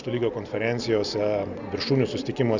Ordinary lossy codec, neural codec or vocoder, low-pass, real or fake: Opus, 64 kbps; none; 7.2 kHz; real